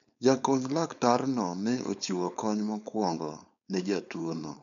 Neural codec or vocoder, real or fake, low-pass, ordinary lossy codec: codec, 16 kHz, 4.8 kbps, FACodec; fake; 7.2 kHz; none